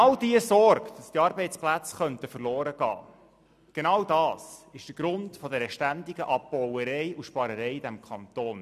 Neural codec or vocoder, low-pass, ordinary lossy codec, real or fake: none; 14.4 kHz; none; real